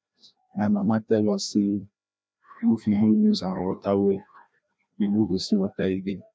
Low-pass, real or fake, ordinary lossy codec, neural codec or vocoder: none; fake; none; codec, 16 kHz, 1 kbps, FreqCodec, larger model